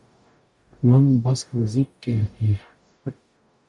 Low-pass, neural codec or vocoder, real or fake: 10.8 kHz; codec, 44.1 kHz, 0.9 kbps, DAC; fake